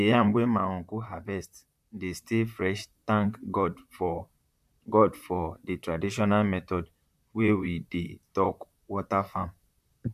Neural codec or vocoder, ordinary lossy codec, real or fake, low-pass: vocoder, 44.1 kHz, 128 mel bands, Pupu-Vocoder; none; fake; 14.4 kHz